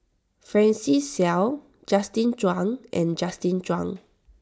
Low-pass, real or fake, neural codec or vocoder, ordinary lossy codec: none; real; none; none